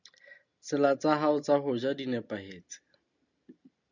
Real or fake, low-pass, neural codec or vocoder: real; 7.2 kHz; none